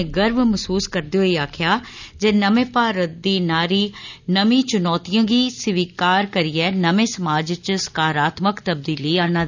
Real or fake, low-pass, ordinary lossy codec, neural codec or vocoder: real; 7.2 kHz; none; none